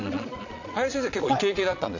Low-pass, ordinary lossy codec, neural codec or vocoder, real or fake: 7.2 kHz; none; vocoder, 22.05 kHz, 80 mel bands, Vocos; fake